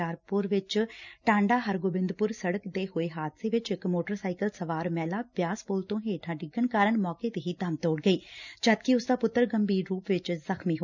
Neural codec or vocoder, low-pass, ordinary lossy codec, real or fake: none; 7.2 kHz; none; real